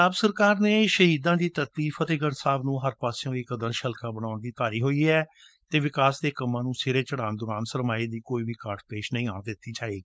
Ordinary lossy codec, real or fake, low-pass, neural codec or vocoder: none; fake; none; codec, 16 kHz, 4.8 kbps, FACodec